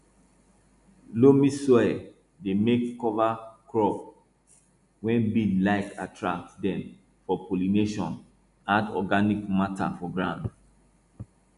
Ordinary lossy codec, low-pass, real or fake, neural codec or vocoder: none; 10.8 kHz; real; none